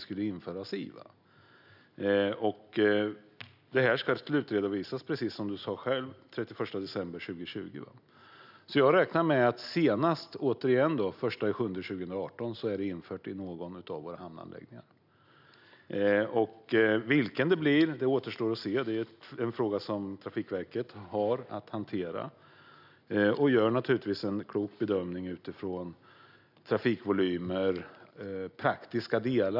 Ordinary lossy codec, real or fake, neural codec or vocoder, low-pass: none; real; none; 5.4 kHz